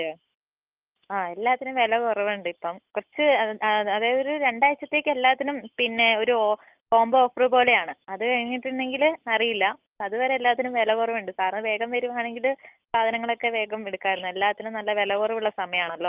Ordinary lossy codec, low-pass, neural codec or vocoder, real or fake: Opus, 24 kbps; 3.6 kHz; none; real